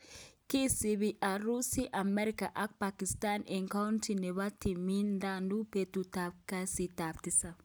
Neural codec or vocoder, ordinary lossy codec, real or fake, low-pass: none; none; real; none